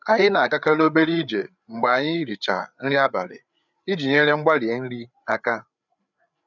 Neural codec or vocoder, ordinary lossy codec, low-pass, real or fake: codec, 16 kHz, 8 kbps, FreqCodec, larger model; none; 7.2 kHz; fake